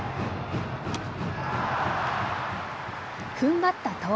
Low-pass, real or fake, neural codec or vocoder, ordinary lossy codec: none; real; none; none